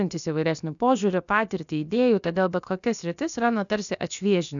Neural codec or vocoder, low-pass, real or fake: codec, 16 kHz, about 1 kbps, DyCAST, with the encoder's durations; 7.2 kHz; fake